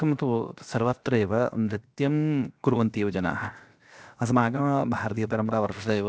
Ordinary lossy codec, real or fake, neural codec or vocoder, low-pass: none; fake; codec, 16 kHz, 0.7 kbps, FocalCodec; none